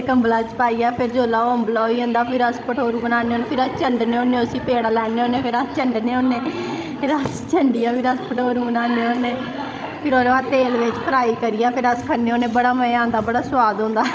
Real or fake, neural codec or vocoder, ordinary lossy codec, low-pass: fake; codec, 16 kHz, 16 kbps, FreqCodec, larger model; none; none